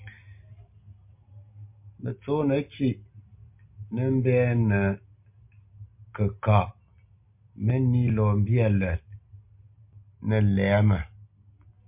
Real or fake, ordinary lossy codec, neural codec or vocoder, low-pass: real; MP3, 32 kbps; none; 3.6 kHz